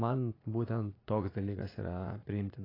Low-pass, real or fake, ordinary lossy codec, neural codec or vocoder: 5.4 kHz; fake; AAC, 24 kbps; vocoder, 44.1 kHz, 80 mel bands, Vocos